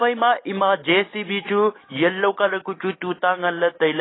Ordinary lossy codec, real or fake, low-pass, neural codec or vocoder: AAC, 16 kbps; real; 7.2 kHz; none